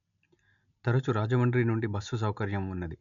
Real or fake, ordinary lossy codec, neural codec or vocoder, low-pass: real; none; none; 7.2 kHz